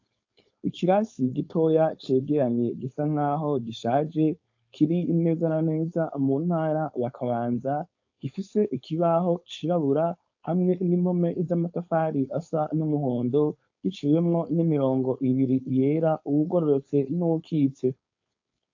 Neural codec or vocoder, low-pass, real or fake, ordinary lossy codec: codec, 16 kHz, 4.8 kbps, FACodec; 7.2 kHz; fake; AAC, 48 kbps